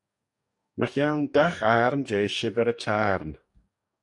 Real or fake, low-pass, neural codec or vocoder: fake; 10.8 kHz; codec, 44.1 kHz, 2.6 kbps, DAC